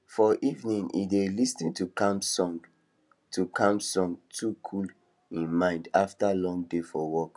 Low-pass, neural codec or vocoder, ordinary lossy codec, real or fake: 10.8 kHz; none; none; real